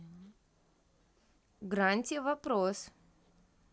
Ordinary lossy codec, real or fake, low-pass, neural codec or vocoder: none; real; none; none